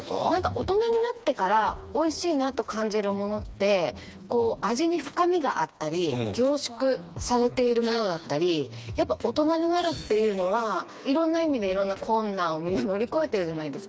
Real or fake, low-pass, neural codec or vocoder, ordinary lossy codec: fake; none; codec, 16 kHz, 2 kbps, FreqCodec, smaller model; none